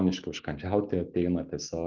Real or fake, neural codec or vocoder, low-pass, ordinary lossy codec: real; none; 7.2 kHz; Opus, 24 kbps